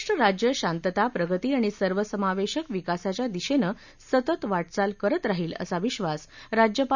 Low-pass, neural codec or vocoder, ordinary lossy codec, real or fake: 7.2 kHz; none; none; real